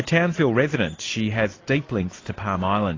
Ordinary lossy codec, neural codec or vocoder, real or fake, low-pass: AAC, 32 kbps; none; real; 7.2 kHz